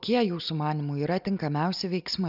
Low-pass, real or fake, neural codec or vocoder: 5.4 kHz; real; none